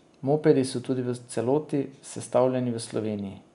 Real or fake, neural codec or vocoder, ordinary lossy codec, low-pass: real; none; none; 10.8 kHz